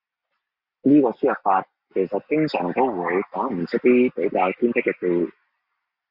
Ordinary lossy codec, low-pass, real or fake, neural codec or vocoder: Opus, 64 kbps; 5.4 kHz; real; none